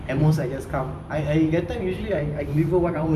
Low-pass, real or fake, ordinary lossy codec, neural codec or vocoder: 14.4 kHz; fake; none; vocoder, 44.1 kHz, 128 mel bands every 256 samples, BigVGAN v2